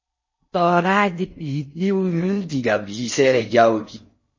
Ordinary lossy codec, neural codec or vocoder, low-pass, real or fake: MP3, 32 kbps; codec, 16 kHz in and 24 kHz out, 0.6 kbps, FocalCodec, streaming, 4096 codes; 7.2 kHz; fake